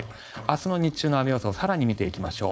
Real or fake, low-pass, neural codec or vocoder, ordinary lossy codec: fake; none; codec, 16 kHz, 4.8 kbps, FACodec; none